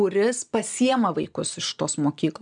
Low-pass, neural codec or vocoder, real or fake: 9.9 kHz; none; real